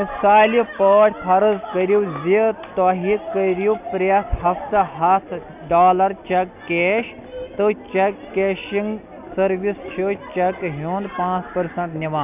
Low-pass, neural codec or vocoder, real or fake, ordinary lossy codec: 3.6 kHz; none; real; none